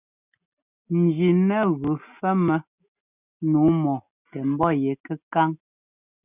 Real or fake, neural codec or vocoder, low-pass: real; none; 3.6 kHz